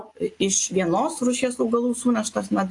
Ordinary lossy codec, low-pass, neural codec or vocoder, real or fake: AAC, 48 kbps; 10.8 kHz; none; real